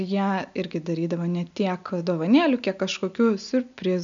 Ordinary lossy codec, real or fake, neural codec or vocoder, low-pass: MP3, 64 kbps; real; none; 7.2 kHz